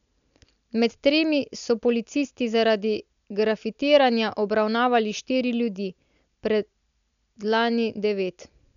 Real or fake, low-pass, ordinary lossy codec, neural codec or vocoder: real; 7.2 kHz; none; none